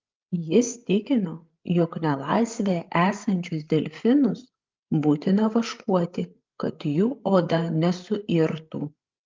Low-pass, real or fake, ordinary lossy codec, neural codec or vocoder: 7.2 kHz; fake; Opus, 24 kbps; codec, 16 kHz, 16 kbps, FreqCodec, larger model